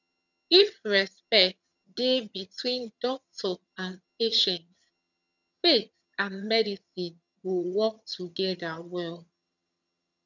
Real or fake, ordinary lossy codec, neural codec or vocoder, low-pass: fake; none; vocoder, 22.05 kHz, 80 mel bands, HiFi-GAN; 7.2 kHz